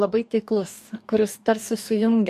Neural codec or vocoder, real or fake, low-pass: codec, 44.1 kHz, 2.6 kbps, DAC; fake; 14.4 kHz